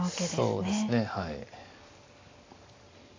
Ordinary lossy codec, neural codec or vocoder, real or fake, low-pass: AAC, 32 kbps; none; real; 7.2 kHz